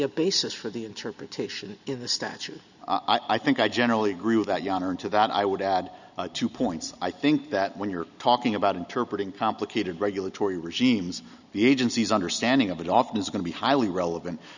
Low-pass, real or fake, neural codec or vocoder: 7.2 kHz; real; none